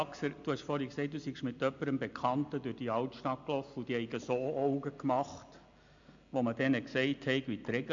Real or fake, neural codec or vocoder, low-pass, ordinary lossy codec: real; none; 7.2 kHz; MP3, 64 kbps